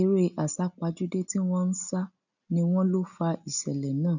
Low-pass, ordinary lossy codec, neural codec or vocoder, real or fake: 7.2 kHz; none; none; real